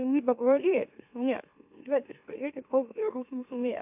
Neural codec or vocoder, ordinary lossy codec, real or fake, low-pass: autoencoder, 44.1 kHz, a latent of 192 numbers a frame, MeloTTS; AAC, 32 kbps; fake; 3.6 kHz